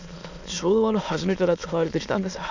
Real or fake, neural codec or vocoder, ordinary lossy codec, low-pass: fake; autoencoder, 22.05 kHz, a latent of 192 numbers a frame, VITS, trained on many speakers; none; 7.2 kHz